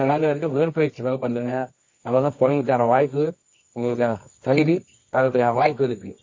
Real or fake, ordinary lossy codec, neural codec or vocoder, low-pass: fake; MP3, 32 kbps; codec, 24 kHz, 0.9 kbps, WavTokenizer, medium music audio release; 7.2 kHz